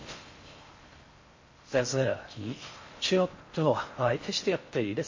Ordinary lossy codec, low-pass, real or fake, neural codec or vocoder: MP3, 32 kbps; 7.2 kHz; fake; codec, 16 kHz in and 24 kHz out, 0.6 kbps, FocalCodec, streaming, 4096 codes